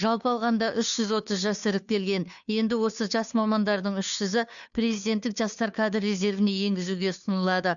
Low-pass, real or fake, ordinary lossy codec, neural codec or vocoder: 7.2 kHz; fake; none; codec, 16 kHz, 2 kbps, FunCodec, trained on Chinese and English, 25 frames a second